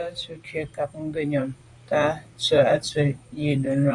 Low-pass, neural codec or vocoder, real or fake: 10.8 kHz; vocoder, 44.1 kHz, 128 mel bands, Pupu-Vocoder; fake